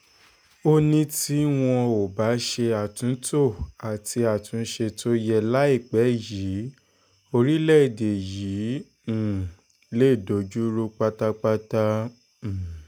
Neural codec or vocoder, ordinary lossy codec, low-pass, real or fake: none; none; none; real